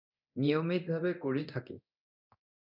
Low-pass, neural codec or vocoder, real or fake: 5.4 kHz; codec, 24 kHz, 0.9 kbps, DualCodec; fake